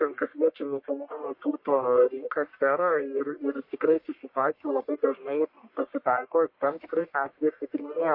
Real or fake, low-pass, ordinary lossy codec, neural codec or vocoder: fake; 5.4 kHz; MP3, 32 kbps; codec, 44.1 kHz, 1.7 kbps, Pupu-Codec